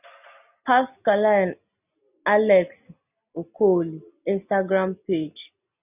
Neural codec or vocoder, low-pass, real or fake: none; 3.6 kHz; real